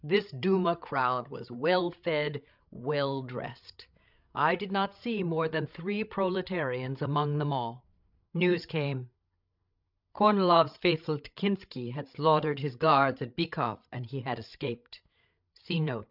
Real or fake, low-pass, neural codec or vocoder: fake; 5.4 kHz; codec, 16 kHz, 8 kbps, FreqCodec, larger model